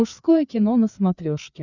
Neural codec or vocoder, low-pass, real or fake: codec, 16 kHz, 4 kbps, FreqCodec, larger model; 7.2 kHz; fake